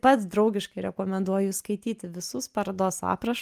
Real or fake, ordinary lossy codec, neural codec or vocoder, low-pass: real; Opus, 32 kbps; none; 14.4 kHz